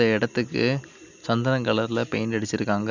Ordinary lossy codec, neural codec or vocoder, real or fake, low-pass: none; none; real; 7.2 kHz